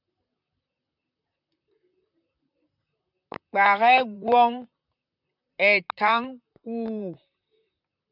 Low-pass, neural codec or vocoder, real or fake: 5.4 kHz; vocoder, 44.1 kHz, 128 mel bands, Pupu-Vocoder; fake